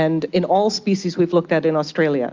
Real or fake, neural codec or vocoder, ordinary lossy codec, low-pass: real; none; Opus, 32 kbps; 7.2 kHz